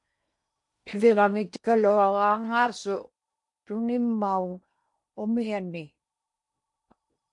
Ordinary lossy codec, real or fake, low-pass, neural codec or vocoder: MP3, 64 kbps; fake; 10.8 kHz; codec, 16 kHz in and 24 kHz out, 0.8 kbps, FocalCodec, streaming, 65536 codes